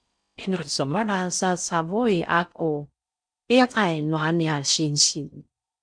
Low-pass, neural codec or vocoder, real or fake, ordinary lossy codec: 9.9 kHz; codec, 16 kHz in and 24 kHz out, 0.6 kbps, FocalCodec, streaming, 4096 codes; fake; MP3, 64 kbps